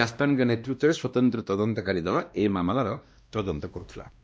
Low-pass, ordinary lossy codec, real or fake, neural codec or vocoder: none; none; fake; codec, 16 kHz, 1 kbps, X-Codec, WavLM features, trained on Multilingual LibriSpeech